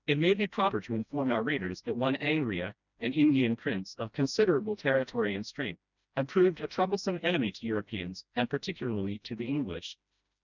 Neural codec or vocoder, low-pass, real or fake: codec, 16 kHz, 1 kbps, FreqCodec, smaller model; 7.2 kHz; fake